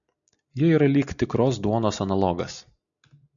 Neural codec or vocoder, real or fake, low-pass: none; real; 7.2 kHz